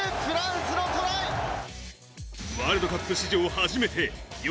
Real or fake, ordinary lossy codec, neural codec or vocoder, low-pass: real; none; none; none